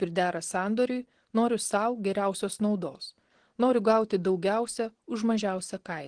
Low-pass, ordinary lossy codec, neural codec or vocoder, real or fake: 9.9 kHz; Opus, 16 kbps; none; real